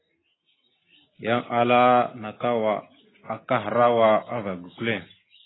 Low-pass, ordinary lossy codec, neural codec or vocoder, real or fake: 7.2 kHz; AAC, 16 kbps; none; real